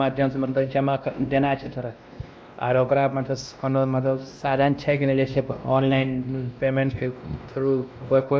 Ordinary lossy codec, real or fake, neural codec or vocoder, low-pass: none; fake; codec, 16 kHz, 1 kbps, X-Codec, WavLM features, trained on Multilingual LibriSpeech; none